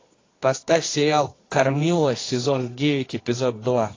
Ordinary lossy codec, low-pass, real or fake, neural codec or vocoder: AAC, 32 kbps; 7.2 kHz; fake; codec, 24 kHz, 0.9 kbps, WavTokenizer, medium music audio release